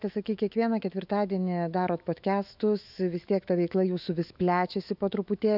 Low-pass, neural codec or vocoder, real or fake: 5.4 kHz; autoencoder, 48 kHz, 128 numbers a frame, DAC-VAE, trained on Japanese speech; fake